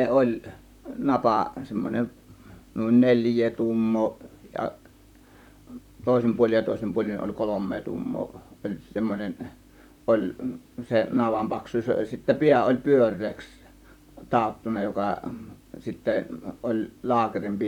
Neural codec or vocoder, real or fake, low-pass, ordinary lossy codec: vocoder, 44.1 kHz, 128 mel bands, Pupu-Vocoder; fake; 19.8 kHz; none